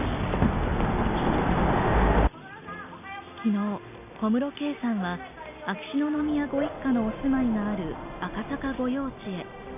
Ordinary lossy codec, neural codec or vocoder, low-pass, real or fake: none; none; 3.6 kHz; real